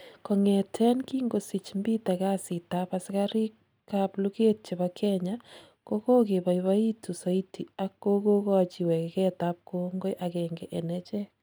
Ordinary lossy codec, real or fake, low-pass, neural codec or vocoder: none; real; none; none